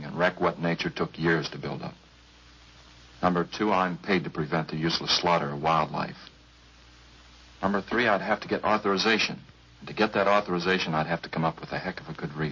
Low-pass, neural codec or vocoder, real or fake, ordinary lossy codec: 7.2 kHz; none; real; MP3, 32 kbps